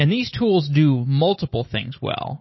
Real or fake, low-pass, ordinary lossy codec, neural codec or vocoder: real; 7.2 kHz; MP3, 24 kbps; none